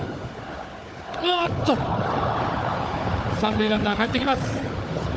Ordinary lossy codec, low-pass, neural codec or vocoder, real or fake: none; none; codec, 16 kHz, 4 kbps, FunCodec, trained on Chinese and English, 50 frames a second; fake